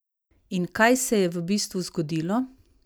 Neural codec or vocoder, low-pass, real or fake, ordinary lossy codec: none; none; real; none